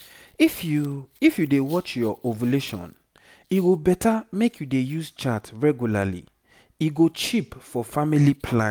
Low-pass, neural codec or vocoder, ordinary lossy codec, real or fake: none; none; none; real